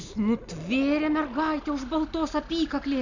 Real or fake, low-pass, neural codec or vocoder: real; 7.2 kHz; none